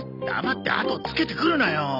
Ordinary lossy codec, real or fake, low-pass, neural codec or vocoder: none; real; 5.4 kHz; none